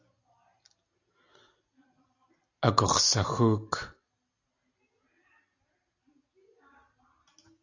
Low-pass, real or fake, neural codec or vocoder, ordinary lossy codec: 7.2 kHz; real; none; AAC, 48 kbps